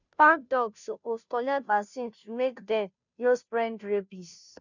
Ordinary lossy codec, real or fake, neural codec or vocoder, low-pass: none; fake; codec, 16 kHz, 0.5 kbps, FunCodec, trained on Chinese and English, 25 frames a second; 7.2 kHz